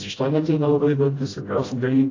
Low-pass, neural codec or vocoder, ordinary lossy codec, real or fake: 7.2 kHz; codec, 16 kHz, 0.5 kbps, FreqCodec, smaller model; AAC, 32 kbps; fake